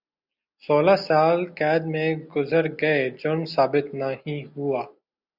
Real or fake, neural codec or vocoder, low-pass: real; none; 5.4 kHz